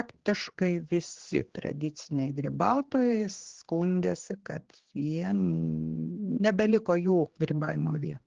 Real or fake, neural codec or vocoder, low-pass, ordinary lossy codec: fake; codec, 16 kHz, 2 kbps, X-Codec, HuBERT features, trained on general audio; 7.2 kHz; Opus, 16 kbps